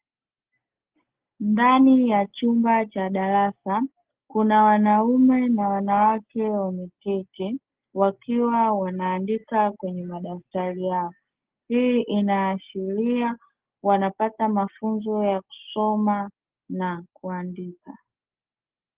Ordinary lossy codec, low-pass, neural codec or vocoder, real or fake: Opus, 16 kbps; 3.6 kHz; none; real